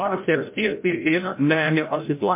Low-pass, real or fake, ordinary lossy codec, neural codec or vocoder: 3.6 kHz; fake; MP3, 24 kbps; codec, 16 kHz, 0.5 kbps, FreqCodec, larger model